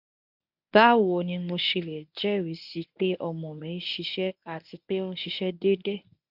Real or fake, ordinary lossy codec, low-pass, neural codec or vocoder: fake; none; 5.4 kHz; codec, 24 kHz, 0.9 kbps, WavTokenizer, medium speech release version 1